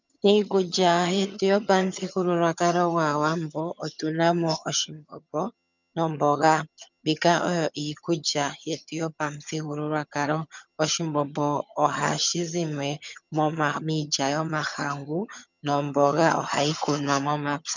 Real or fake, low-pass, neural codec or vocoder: fake; 7.2 kHz; vocoder, 22.05 kHz, 80 mel bands, HiFi-GAN